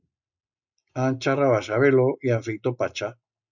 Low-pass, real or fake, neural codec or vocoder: 7.2 kHz; real; none